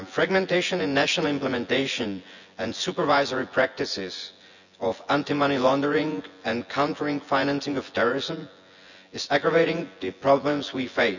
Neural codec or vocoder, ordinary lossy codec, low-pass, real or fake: vocoder, 24 kHz, 100 mel bands, Vocos; none; 7.2 kHz; fake